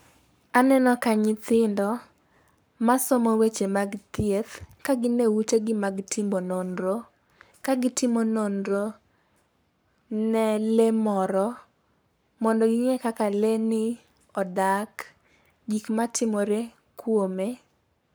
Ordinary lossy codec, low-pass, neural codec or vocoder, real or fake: none; none; codec, 44.1 kHz, 7.8 kbps, Pupu-Codec; fake